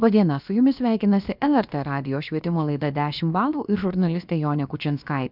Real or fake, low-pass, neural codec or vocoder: fake; 5.4 kHz; codec, 16 kHz, about 1 kbps, DyCAST, with the encoder's durations